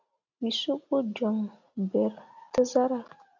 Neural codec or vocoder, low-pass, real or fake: none; 7.2 kHz; real